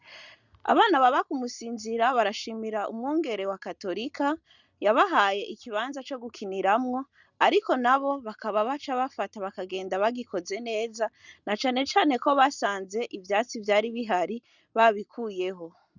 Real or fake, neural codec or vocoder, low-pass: real; none; 7.2 kHz